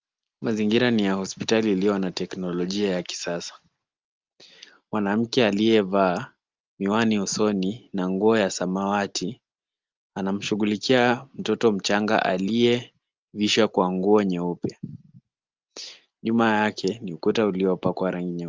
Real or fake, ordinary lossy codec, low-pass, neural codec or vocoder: real; Opus, 32 kbps; 7.2 kHz; none